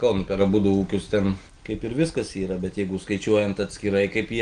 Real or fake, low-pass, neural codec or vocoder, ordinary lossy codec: real; 9.9 kHz; none; Opus, 24 kbps